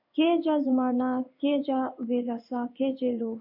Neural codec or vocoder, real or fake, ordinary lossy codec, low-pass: codec, 16 kHz in and 24 kHz out, 1 kbps, XY-Tokenizer; fake; MP3, 48 kbps; 5.4 kHz